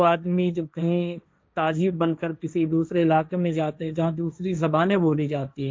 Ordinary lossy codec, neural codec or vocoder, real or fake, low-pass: none; codec, 16 kHz, 1.1 kbps, Voila-Tokenizer; fake; none